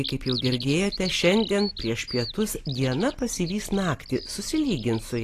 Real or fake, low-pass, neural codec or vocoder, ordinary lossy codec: real; 14.4 kHz; none; AAC, 48 kbps